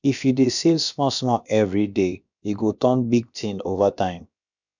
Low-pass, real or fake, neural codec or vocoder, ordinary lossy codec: 7.2 kHz; fake; codec, 16 kHz, about 1 kbps, DyCAST, with the encoder's durations; none